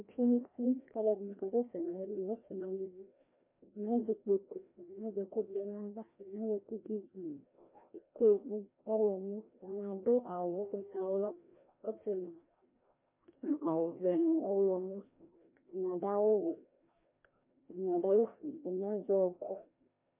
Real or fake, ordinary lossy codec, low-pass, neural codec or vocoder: fake; MP3, 32 kbps; 3.6 kHz; codec, 16 kHz, 1 kbps, FreqCodec, larger model